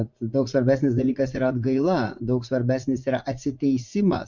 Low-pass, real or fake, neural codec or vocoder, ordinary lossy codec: 7.2 kHz; fake; vocoder, 44.1 kHz, 80 mel bands, Vocos; MP3, 64 kbps